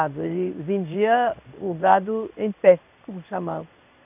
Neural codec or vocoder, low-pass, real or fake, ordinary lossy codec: codec, 16 kHz in and 24 kHz out, 1 kbps, XY-Tokenizer; 3.6 kHz; fake; none